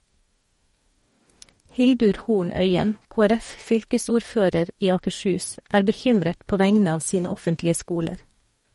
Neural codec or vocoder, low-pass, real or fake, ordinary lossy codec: codec, 44.1 kHz, 2.6 kbps, DAC; 19.8 kHz; fake; MP3, 48 kbps